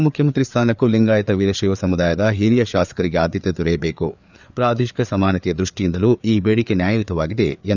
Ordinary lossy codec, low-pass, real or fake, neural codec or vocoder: none; 7.2 kHz; fake; codec, 16 kHz, 4 kbps, FreqCodec, larger model